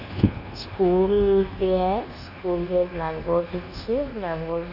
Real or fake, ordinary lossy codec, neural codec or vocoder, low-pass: fake; none; codec, 24 kHz, 1.2 kbps, DualCodec; 5.4 kHz